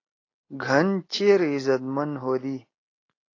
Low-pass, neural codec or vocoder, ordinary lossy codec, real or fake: 7.2 kHz; none; AAC, 32 kbps; real